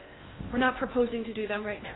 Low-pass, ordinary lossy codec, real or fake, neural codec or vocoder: 7.2 kHz; AAC, 16 kbps; fake; codec, 16 kHz in and 24 kHz out, 0.8 kbps, FocalCodec, streaming, 65536 codes